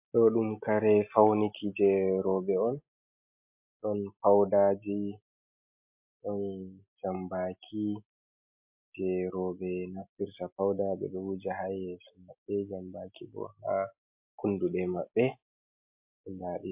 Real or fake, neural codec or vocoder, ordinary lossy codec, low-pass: real; none; MP3, 32 kbps; 3.6 kHz